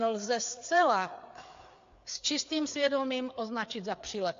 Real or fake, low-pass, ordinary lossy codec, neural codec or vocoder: fake; 7.2 kHz; AAC, 48 kbps; codec, 16 kHz, 4 kbps, FreqCodec, larger model